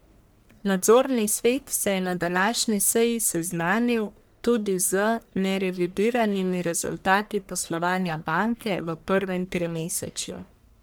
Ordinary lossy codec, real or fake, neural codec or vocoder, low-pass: none; fake; codec, 44.1 kHz, 1.7 kbps, Pupu-Codec; none